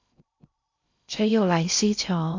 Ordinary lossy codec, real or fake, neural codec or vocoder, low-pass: MP3, 48 kbps; fake; codec, 16 kHz in and 24 kHz out, 0.6 kbps, FocalCodec, streaming, 2048 codes; 7.2 kHz